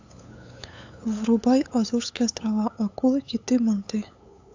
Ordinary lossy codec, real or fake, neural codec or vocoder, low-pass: AAC, 48 kbps; fake; codec, 16 kHz, 8 kbps, FunCodec, trained on LibriTTS, 25 frames a second; 7.2 kHz